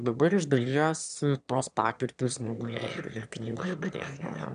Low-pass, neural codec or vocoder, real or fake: 9.9 kHz; autoencoder, 22.05 kHz, a latent of 192 numbers a frame, VITS, trained on one speaker; fake